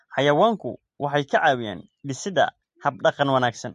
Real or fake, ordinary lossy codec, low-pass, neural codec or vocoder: real; MP3, 48 kbps; 10.8 kHz; none